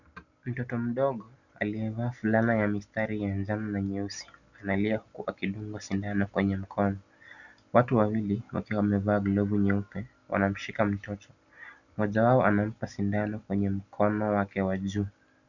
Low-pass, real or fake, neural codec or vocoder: 7.2 kHz; real; none